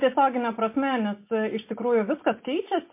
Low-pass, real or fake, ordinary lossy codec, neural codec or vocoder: 3.6 kHz; real; MP3, 24 kbps; none